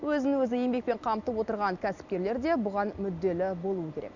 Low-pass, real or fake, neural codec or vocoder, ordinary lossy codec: 7.2 kHz; real; none; none